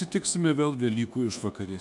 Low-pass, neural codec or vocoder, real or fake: 10.8 kHz; codec, 24 kHz, 1.2 kbps, DualCodec; fake